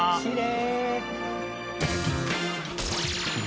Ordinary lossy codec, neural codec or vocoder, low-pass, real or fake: none; none; none; real